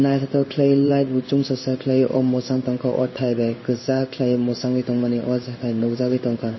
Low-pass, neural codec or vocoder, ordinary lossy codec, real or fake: 7.2 kHz; codec, 16 kHz in and 24 kHz out, 1 kbps, XY-Tokenizer; MP3, 24 kbps; fake